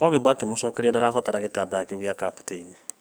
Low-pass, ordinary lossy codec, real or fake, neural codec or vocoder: none; none; fake; codec, 44.1 kHz, 2.6 kbps, SNAC